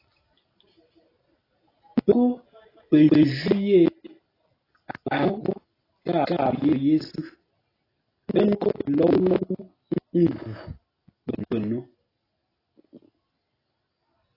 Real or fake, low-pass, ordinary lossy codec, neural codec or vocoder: real; 5.4 kHz; AAC, 24 kbps; none